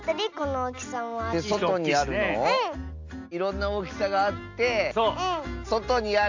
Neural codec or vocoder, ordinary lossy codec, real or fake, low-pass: none; AAC, 48 kbps; real; 7.2 kHz